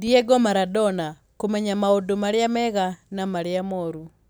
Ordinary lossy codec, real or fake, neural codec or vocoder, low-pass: none; real; none; none